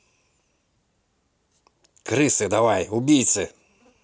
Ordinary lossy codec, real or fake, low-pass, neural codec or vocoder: none; real; none; none